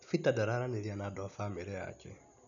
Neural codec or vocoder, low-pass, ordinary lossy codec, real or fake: none; 7.2 kHz; none; real